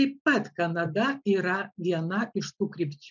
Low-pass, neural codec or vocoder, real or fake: 7.2 kHz; none; real